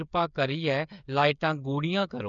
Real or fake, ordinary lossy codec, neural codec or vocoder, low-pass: fake; none; codec, 16 kHz, 8 kbps, FreqCodec, smaller model; 7.2 kHz